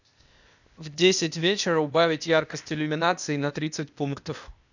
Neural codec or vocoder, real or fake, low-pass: codec, 16 kHz, 0.8 kbps, ZipCodec; fake; 7.2 kHz